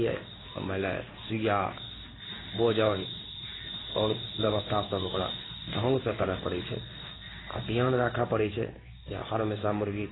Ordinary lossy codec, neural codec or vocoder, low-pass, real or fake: AAC, 16 kbps; codec, 16 kHz in and 24 kHz out, 1 kbps, XY-Tokenizer; 7.2 kHz; fake